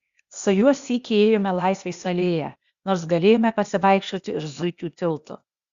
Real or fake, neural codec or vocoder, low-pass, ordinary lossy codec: fake; codec, 16 kHz, 0.8 kbps, ZipCodec; 7.2 kHz; Opus, 64 kbps